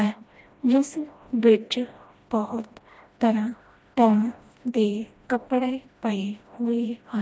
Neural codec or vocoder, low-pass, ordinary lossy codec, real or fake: codec, 16 kHz, 1 kbps, FreqCodec, smaller model; none; none; fake